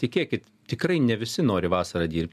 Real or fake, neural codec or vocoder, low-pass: real; none; 14.4 kHz